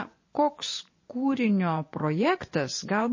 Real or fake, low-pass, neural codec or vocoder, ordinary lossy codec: fake; 7.2 kHz; vocoder, 24 kHz, 100 mel bands, Vocos; MP3, 32 kbps